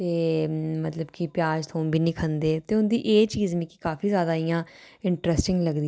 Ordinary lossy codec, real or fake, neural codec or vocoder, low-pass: none; real; none; none